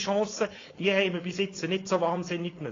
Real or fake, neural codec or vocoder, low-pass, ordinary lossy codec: fake; codec, 16 kHz, 4.8 kbps, FACodec; 7.2 kHz; AAC, 32 kbps